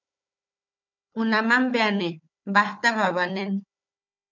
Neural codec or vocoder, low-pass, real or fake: codec, 16 kHz, 4 kbps, FunCodec, trained on Chinese and English, 50 frames a second; 7.2 kHz; fake